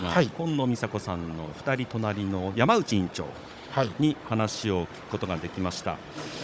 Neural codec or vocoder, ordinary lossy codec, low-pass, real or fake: codec, 16 kHz, 16 kbps, FunCodec, trained on Chinese and English, 50 frames a second; none; none; fake